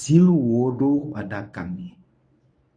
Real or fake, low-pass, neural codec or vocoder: fake; 9.9 kHz; codec, 24 kHz, 0.9 kbps, WavTokenizer, medium speech release version 1